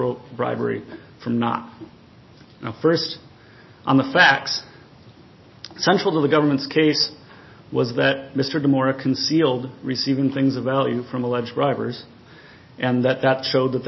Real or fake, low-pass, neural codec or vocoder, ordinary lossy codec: real; 7.2 kHz; none; MP3, 24 kbps